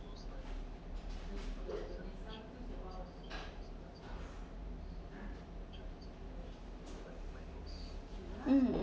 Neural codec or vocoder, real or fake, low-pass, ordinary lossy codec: none; real; none; none